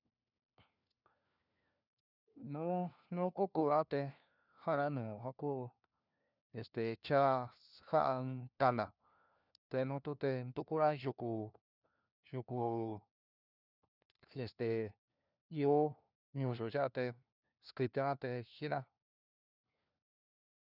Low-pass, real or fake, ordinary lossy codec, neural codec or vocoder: 5.4 kHz; fake; none; codec, 16 kHz, 1 kbps, FunCodec, trained on LibriTTS, 50 frames a second